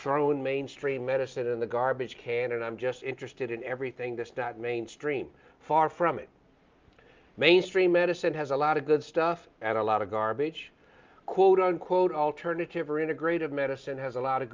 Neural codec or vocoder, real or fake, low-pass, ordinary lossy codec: none; real; 7.2 kHz; Opus, 24 kbps